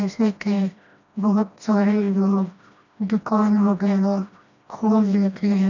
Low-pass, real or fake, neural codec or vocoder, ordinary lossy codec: 7.2 kHz; fake; codec, 16 kHz, 1 kbps, FreqCodec, smaller model; none